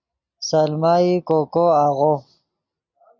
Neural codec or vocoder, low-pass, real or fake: none; 7.2 kHz; real